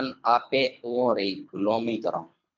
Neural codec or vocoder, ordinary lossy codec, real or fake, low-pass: codec, 24 kHz, 3 kbps, HILCodec; MP3, 64 kbps; fake; 7.2 kHz